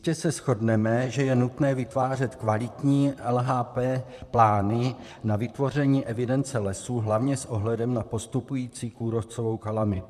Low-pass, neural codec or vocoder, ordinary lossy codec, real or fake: 14.4 kHz; vocoder, 44.1 kHz, 128 mel bands, Pupu-Vocoder; MP3, 96 kbps; fake